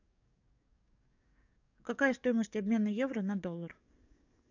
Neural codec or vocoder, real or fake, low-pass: codec, 44.1 kHz, 7.8 kbps, DAC; fake; 7.2 kHz